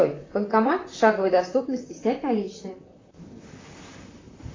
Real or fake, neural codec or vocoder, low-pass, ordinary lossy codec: fake; vocoder, 44.1 kHz, 128 mel bands, Pupu-Vocoder; 7.2 kHz; AAC, 32 kbps